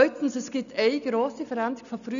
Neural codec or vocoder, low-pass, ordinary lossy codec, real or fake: none; 7.2 kHz; none; real